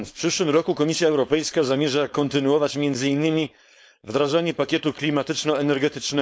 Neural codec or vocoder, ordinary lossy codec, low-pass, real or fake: codec, 16 kHz, 4.8 kbps, FACodec; none; none; fake